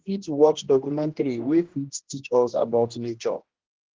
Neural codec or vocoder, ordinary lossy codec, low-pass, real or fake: codec, 16 kHz, 1 kbps, X-Codec, HuBERT features, trained on general audio; Opus, 16 kbps; 7.2 kHz; fake